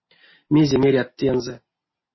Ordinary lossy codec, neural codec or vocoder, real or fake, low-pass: MP3, 24 kbps; none; real; 7.2 kHz